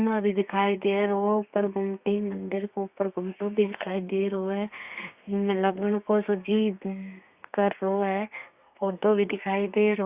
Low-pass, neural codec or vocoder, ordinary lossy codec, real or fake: 3.6 kHz; codec, 32 kHz, 1.9 kbps, SNAC; Opus, 64 kbps; fake